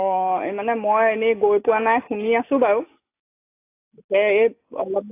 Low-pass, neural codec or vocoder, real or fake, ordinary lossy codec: 3.6 kHz; none; real; AAC, 24 kbps